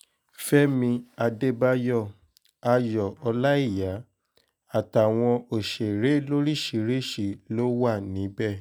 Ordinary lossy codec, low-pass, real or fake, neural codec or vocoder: none; none; real; none